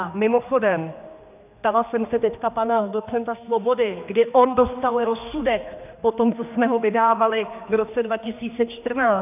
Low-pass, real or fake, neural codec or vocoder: 3.6 kHz; fake; codec, 16 kHz, 2 kbps, X-Codec, HuBERT features, trained on general audio